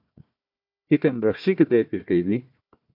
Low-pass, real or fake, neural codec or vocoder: 5.4 kHz; fake; codec, 16 kHz, 1 kbps, FunCodec, trained on Chinese and English, 50 frames a second